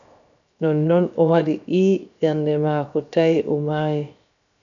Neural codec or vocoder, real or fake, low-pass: codec, 16 kHz, 0.7 kbps, FocalCodec; fake; 7.2 kHz